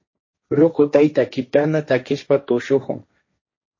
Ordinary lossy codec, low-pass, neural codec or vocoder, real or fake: MP3, 32 kbps; 7.2 kHz; codec, 16 kHz, 1.1 kbps, Voila-Tokenizer; fake